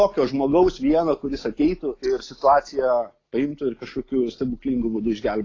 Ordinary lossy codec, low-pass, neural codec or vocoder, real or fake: AAC, 32 kbps; 7.2 kHz; none; real